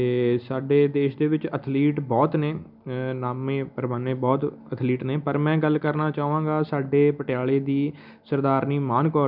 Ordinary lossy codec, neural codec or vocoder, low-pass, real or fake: none; none; 5.4 kHz; real